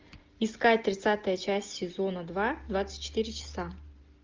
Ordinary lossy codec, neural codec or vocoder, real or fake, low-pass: Opus, 24 kbps; none; real; 7.2 kHz